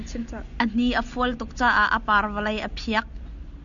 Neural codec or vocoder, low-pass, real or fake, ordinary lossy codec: none; 7.2 kHz; real; MP3, 96 kbps